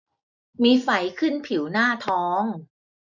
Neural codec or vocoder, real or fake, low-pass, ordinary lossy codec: none; real; 7.2 kHz; none